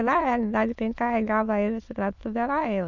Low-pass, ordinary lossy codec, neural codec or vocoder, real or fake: 7.2 kHz; none; autoencoder, 22.05 kHz, a latent of 192 numbers a frame, VITS, trained on many speakers; fake